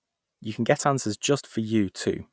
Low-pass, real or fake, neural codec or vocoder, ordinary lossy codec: none; real; none; none